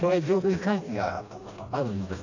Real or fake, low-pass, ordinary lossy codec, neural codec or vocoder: fake; 7.2 kHz; none; codec, 16 kHz, 1 kbps, FreqCodec, smaller model